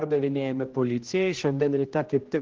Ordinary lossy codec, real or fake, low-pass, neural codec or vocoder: Opus, 16 kbps; fake; 7.2 kHz; codec, 16 kHz, 1 kbps, X-Codec, HuBERT features, trained on general audio